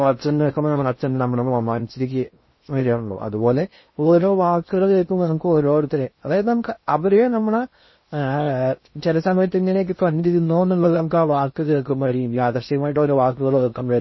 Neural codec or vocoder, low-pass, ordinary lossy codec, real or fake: codec, 16 kHz in and 24 kHz out, 0.6 kbps, FocalCodec, streaming, 2048 codes; 7.2 kHz; MP3, 24 kbps; fake